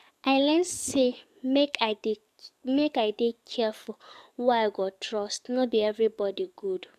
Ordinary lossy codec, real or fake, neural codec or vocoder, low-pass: none; fake; codec, 44.1 kHz, 7.8 kbps, DAC; 14.4 kHz